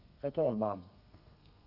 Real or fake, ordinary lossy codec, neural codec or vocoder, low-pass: fake; none; codec, 32 kHz, 1.9 kbps, SNAC; 5.4 kHz